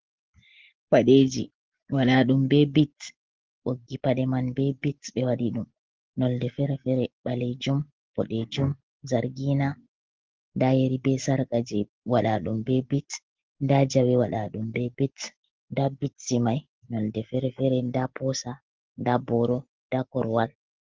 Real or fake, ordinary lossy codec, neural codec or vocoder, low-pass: real; Opus, 16 kbps; none; 7.2 kHz